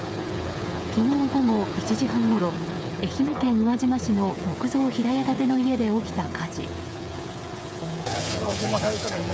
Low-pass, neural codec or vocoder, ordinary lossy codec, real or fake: none; codec, 16 kHz, 8 kbps, FreqCodec, smaller model; none; fake